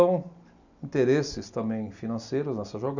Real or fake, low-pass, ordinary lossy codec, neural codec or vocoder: real; 7.2 kHz; none; none